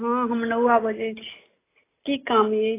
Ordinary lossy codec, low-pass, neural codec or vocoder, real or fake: AAC, 16 kbps; 3.6 kHz; none; real